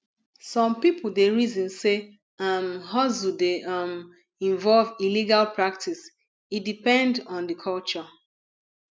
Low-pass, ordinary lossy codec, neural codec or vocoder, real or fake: none; none; none; real